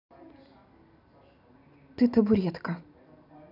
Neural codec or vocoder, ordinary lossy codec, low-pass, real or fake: none; none; 5.4 kHz; real